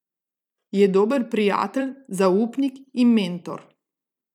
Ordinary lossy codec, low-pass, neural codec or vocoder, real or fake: none; 19.8 kHz; vocoder, 44.1 kHz, 128 mel bands every 256 samples, BigVGAN v2; fake